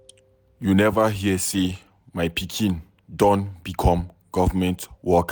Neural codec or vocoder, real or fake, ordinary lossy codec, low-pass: none; real; none; none